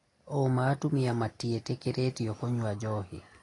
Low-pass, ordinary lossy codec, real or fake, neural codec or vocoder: 10.8 kHz; AAC, 32 kbps; real; none